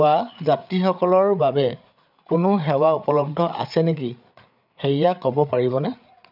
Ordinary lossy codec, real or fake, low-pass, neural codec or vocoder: none; fake; 5.4 kHz; codec, 16 kHz, 8 kbps, FreqCodec, larger model